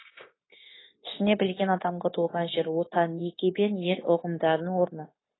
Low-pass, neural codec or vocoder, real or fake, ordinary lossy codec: 7.2 kHz; codec, 16 kHz, 0.9 kbps, LongCat-Audio-Codec; fake; AAC, 16 kbps